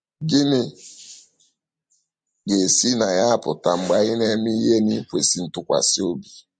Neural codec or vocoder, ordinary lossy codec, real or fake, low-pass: none; MP3, 48 kbps; real; 9.9 kHz